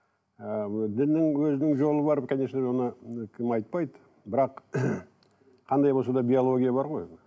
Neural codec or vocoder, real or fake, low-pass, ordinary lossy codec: none; real; none; none